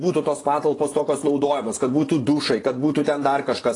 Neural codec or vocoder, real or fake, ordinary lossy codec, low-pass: vocoder, 24 kHz, 100 mel bands, Vocos; fake; AAC, 32 kbps; 10.8 kHz